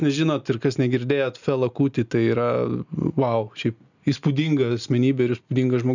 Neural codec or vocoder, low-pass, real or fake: none; 7.2 kHz; real